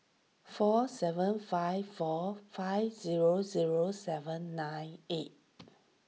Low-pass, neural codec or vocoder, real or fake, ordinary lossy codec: none; none; real; none